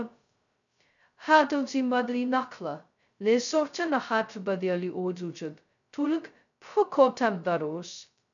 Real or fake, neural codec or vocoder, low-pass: fake; codec, 16 kHz, 0.2 kbps, FocalCodec; 7.2 kHz